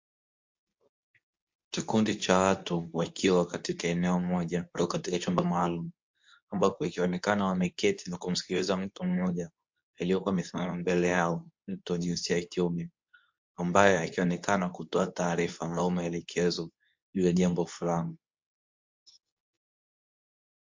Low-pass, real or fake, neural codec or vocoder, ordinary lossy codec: 7.2 kHz; fake; codec, 24 kHz, 0.9 kbps, WavTokenizer, medium speech release version 2; MP3, 48 kbps